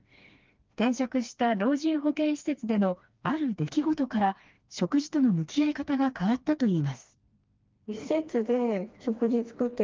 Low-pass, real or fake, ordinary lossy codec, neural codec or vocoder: 7.2 kHz; fake; Opus, 32 kbps; codec, 16 kHz, 2 kbps, FreqCodec, smaller model